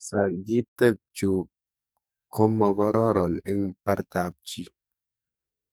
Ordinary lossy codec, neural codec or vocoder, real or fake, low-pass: none; codec, 44.1 kHz, 2.6 kbps, SNAC; fake; none